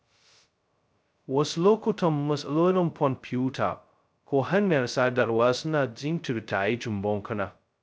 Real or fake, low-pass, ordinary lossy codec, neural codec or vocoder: fake; none; none; codec, 16 kHz, 0.2 kbps, FocalCodec